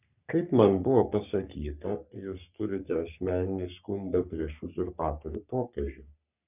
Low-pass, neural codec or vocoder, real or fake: 3.6 kHz; codec, 44.1 kHz, 3.4 kbps, Pupu-Codec; fake